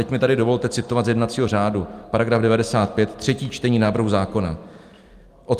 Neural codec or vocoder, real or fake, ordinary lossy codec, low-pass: none; real; Opus, 32 kbps; 14.4 kHz